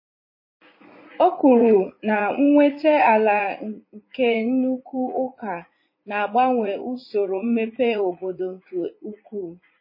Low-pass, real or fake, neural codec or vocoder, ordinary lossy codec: 5.4 kHz; fake; vocoder, 44.1 kHz, 80 mel bands, Vocos; MP3, 24 kbps